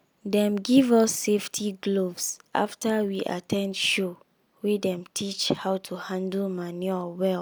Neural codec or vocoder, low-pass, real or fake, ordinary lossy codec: none; none; real; none